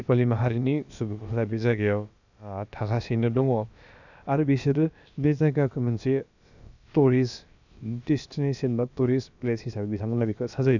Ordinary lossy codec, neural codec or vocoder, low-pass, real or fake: none; codec, 16 kHz, about 1 kbps, DyCAST, with the encoder's durations; 7.2 kHz; fake